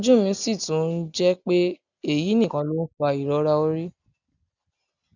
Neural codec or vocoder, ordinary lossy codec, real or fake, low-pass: none; none; real; 7.2 kHz